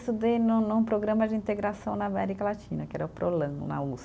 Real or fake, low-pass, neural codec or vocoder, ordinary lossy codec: real; none; none; none